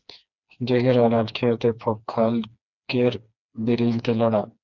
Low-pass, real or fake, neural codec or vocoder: 7.2 kHz; fake; codec, 16 kHz, 2 kbps, FreqCodec, smaller model